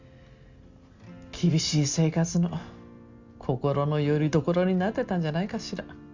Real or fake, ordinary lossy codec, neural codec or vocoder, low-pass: real; Opus, 64 kbps; none; 7.2 kHz